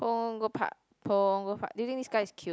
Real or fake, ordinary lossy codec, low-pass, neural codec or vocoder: real; none; none; none